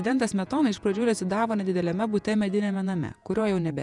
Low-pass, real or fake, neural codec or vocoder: 10.8 kHz; fake; vocoder, 48 kHz, 128 mel bands, Vocos